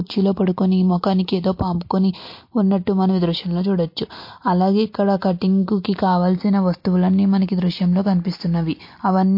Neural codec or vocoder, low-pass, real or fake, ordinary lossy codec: none; 5.4 kHz; real; MP3, 32 kbps